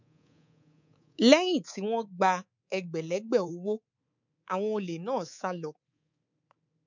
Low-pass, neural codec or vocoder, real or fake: 7.2 kHz; codec, 24 kHz, 3.1 kbps, DualCodec; fake